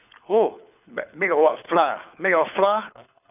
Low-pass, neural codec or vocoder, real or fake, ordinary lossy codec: 3.6 kHz; codec, 24 kHz, 6 kbps, HILCodec; fake; none